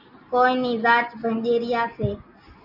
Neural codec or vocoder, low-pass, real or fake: none; 5.4 kHz; real